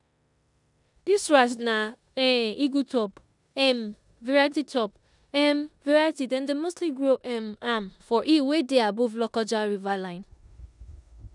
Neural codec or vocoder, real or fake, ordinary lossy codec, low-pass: codec, 16 kHz in and 24 kHz out, 0.9 kbps, LongCat-Audio-Codec, four codebook decoder; fake; none; 10.8 kHz